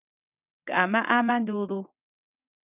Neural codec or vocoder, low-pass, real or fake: vocoder, 22.05 kHz, 80 mel bands, WaveNeXt; 3.6 kHz; fake